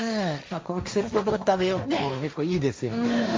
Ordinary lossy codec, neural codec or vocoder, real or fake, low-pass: none; codec, 16 kHz, 1.1 kbps, Voila-Tokenizer; fake; none